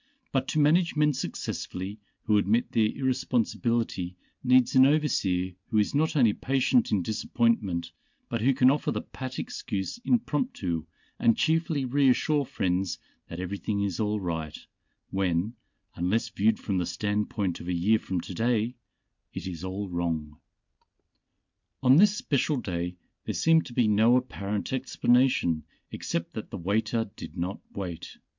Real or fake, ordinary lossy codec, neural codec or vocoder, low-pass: real; MP3, 64 kbps; none; 7.2 kHz